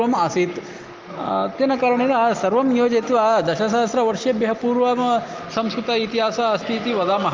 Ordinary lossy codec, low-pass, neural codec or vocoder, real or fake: Opus, 32 kbps; 7.2 kHz; none; real